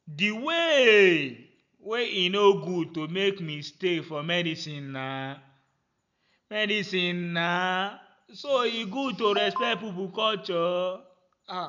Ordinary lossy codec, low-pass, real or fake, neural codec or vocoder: none; 7.2 kHz; real; none